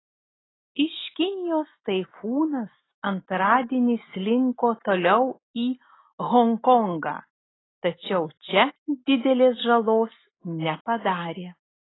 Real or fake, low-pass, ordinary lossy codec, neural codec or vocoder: real; 7.2 kHz; AAC, 16 kbps; none